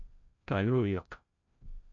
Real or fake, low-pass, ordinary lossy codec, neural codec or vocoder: fake; 7.2 kHz; MP3, 48 kbps; codec, 16 kHz, 0.5 kbps, FreqCodec, larger model